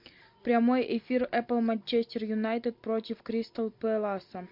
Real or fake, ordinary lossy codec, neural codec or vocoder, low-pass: real; MP3, 48 kbps; none; 5.4 kHz